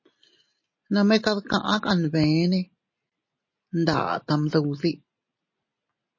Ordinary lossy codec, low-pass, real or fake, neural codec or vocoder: MP3, 32 kbps; 7.2 kHz; real; none